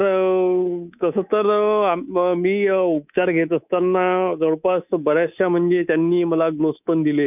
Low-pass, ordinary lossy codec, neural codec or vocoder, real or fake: 3.6 kHz; none; codec, 24 kHz, 3.1 kbps, DualCodec; fake